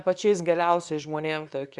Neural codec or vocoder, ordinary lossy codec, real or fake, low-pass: codec, 24 kHz, 0.9 kbps, WavTokenizer, small release; Opus, 64 kbps; fake; 10.8 kHz